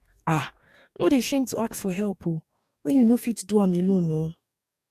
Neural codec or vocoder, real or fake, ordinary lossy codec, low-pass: codec, 44.1 kHz, 2.6 kbps, DAC; fake; none; 14.4 kHz